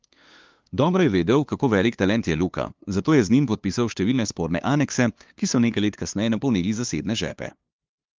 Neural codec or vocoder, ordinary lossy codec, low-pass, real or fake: codec, 16 kHz, 2 kbps, FunCodec, trained on LibriTTS, 25 frames a second; Opus, 32 kbps; 7.2 kHz; fake